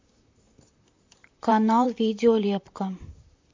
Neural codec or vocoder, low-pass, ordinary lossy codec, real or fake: vocoder, 44.1 kHz, 128 mel bands, Pupu-Vocoder; 7.2 kHz; MP3, 48 kbps; fake